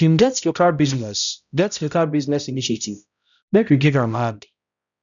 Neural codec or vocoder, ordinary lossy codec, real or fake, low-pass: codec, 16 kHz, 0.5 kbps, X-Codec, HuBERT features, trained on balanced general audio; none; fake; 7.2 kHz